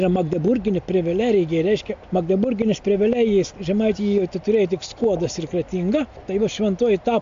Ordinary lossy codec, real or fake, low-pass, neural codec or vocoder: AAC, 64 kbps; real; 7.2 kHz; none